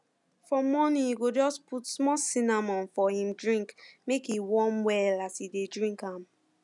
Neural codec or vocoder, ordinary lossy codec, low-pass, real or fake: none; none; 10.8 kHz; real